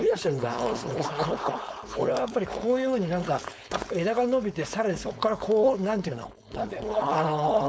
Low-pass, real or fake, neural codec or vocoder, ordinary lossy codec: none; fake; codec, 16 kHz, 4.8 kbps, FACodec; none